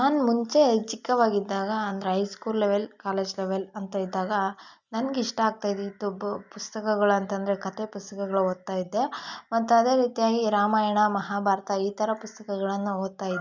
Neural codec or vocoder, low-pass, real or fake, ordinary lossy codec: none; 7.2 kHz; real; none